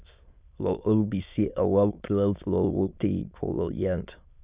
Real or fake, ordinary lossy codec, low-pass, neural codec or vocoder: fake; Opus, 64 kbps; 3.6 kHz; autoencoder, 22.05 kHz, a latent of 192 numbers a frame, VITS, trained on many speakers